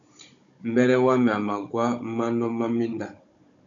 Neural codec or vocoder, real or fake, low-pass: codec, 16 kHz, 16 kbps, FunCodec, trained on Chinese and English, 50 frames a second; fake; 7.2 kHz